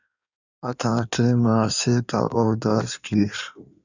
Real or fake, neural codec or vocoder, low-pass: fake; codec, 16 kHz in and 24 kHz out, 1.1 kbps, FireRedTTS-2 codec; 7.2 kHz